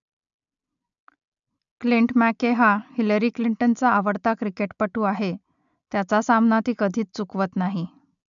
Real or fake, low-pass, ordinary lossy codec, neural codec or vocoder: real; 7.2 kHz; MP3, 96 kbps; none